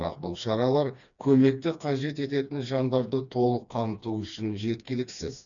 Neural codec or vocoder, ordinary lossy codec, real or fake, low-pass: codec, 16 kHz, 2 kbps, FreqCodec, smaller model; none; fake; 7.2 kHz